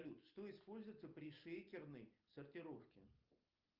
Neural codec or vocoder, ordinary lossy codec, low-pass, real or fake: none; Opus, 24 kbps; 5.4 kHz; real